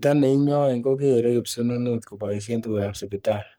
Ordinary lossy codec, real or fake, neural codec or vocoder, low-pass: none; fake; codec, 44.1 kHz, 3.4 kbps, Pupu-Codec; none